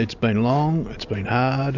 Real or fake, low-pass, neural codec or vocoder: real; 7.2 kHz; none